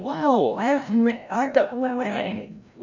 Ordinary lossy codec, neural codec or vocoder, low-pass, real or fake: none; codec, 16 kHz, 0.5 kbps, FreqCodec, larger model; 7.2 kHz; fake